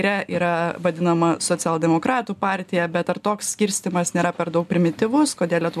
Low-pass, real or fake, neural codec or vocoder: 14.4 kHz; real; none